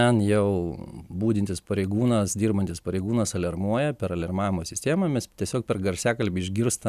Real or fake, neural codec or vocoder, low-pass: real; none; 14.4 kHz